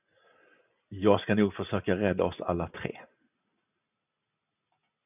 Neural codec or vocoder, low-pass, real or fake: none; 3.6 kHz; real